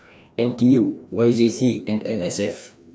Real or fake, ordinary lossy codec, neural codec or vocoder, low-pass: fake; none; codec, 16 kHz, 1 kbps, FreqCodec, larger model; none